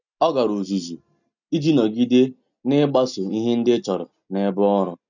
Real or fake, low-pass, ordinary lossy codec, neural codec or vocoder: real; 7.2 kHz; none; none